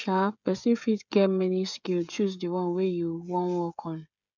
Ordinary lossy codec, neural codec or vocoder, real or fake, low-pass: none; codec, 16 kHz, 16 kbps, FreqCodec, smaller model; fake; 7.2 kHz